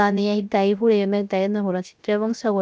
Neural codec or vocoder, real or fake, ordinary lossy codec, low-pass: codec, 16 kHz, 0.7 kbps, FocalCodec; fake; none; none